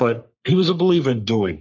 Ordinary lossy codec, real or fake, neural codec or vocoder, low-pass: MP3, 64 kbps; fake; codec, 44.1 kHz, 3.4 kbps, Pupu-Codec; 7.2 kHz